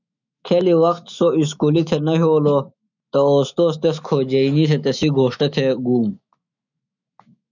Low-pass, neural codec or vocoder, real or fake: 7.2 kHz; autoencoder, 48 kHz, 128 numbers a frame, DAC-VAE, trained on Japanese speech; fake